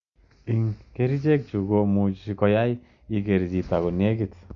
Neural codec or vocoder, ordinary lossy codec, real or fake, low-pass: none; Opus, 64 kbps; real; 7.2 kHz